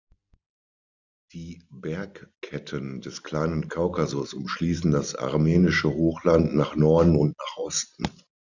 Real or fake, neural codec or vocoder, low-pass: real; none; 7.2 kHz